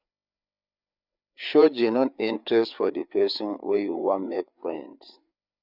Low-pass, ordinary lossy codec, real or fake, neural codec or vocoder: 5.4 kHz; none; fake; codec, 16 kHz, 4 kbps, FreqCodec, larger model